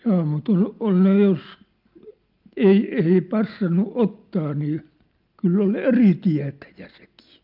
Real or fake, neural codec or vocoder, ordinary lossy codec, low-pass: real; none; Opus, 24 kbps; 5.4 kHz